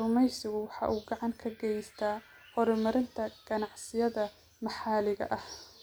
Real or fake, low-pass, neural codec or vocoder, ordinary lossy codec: real; none; none; none